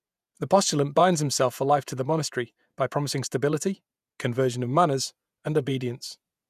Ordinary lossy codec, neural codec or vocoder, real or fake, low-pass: none; vocoder, 48 kHz, 128 mel bands, Vocos; fake; 14.4 kHz